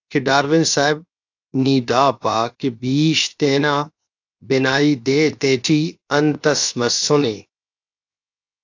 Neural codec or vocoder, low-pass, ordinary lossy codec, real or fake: codec, 16 kHz, 0.7 kbps, FocalCodec; 7.2 kHz; AAC, 48 kbps; fake